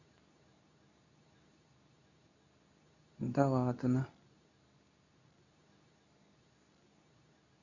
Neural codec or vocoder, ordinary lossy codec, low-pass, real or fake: codec, 24 kHz, 0.9 kbps, WavTokenizer, medium speech release version 2; none; 7.2 kHz; fake